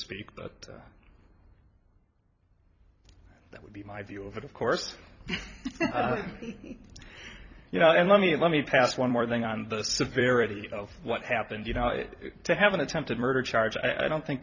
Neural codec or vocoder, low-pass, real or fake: vocoder, 44.1 kHz, 128 mel bands every 256 samples, BigVGAN v2; 7.2 kHz; fake